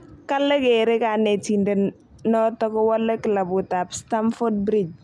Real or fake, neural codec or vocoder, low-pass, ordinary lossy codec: real; none; none; none